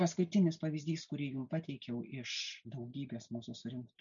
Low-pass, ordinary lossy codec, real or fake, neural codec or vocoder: 7.2 kHz; MP3, 96 kbps; real; none